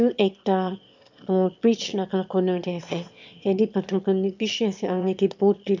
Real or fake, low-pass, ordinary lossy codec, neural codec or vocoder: fake; 7.2 kHz; MP3, 64 kbps; autoencoder, 22.05 kHz, a latent of 192 numbers a frame, VITS, trained on one speaker